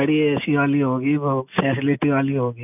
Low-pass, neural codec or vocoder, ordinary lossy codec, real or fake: 3.6 kHz; none; none; real